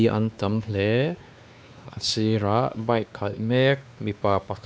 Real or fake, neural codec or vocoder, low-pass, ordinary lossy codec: fake; codec, 16 kHz, 2 kbps, X-Codec, WavLM features, trained on Multilingual LibriSpeech; none; none